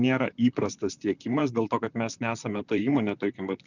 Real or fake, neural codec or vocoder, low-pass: fake; vocoder, 44.1 kHz, 128 mel bands, Pupu-Vocoder; 7.2 kHz